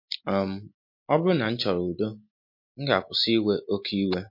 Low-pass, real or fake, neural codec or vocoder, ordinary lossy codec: 5.4 kHz; real; none; MP3, 32 kbps